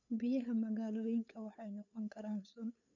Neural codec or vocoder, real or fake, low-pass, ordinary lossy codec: codec, 16 kHz, 8 kbps, FunCodec, trained on LibriTTS, 25 frames a second; fake; 7.2 kHz; none